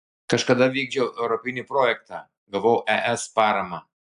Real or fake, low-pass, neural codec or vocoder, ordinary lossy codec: real; 10.8 kHz; none; AAC, 96 kbps